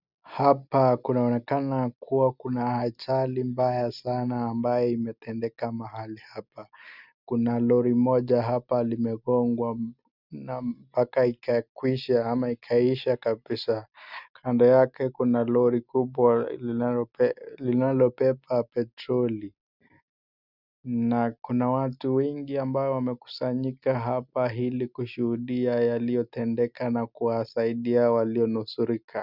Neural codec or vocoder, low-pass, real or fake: none; 5.4 kHz; real